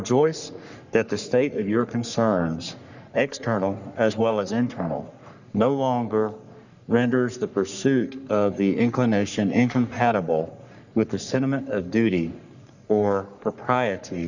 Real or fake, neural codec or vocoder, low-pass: fake; codec, 44.1 kHz, 3.4 kbps, Pupu-Codec; 7.2 kHz